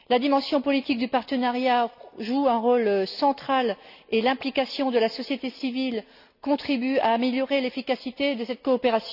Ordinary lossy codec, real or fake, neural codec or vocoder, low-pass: none; real; none; 5.4 kHz